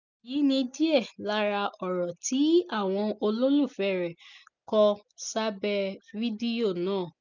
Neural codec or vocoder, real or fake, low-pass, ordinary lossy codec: none; real; 7.2 kHz; none